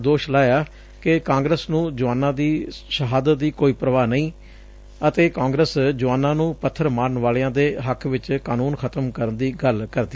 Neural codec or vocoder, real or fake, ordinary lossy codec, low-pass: none; real; none; none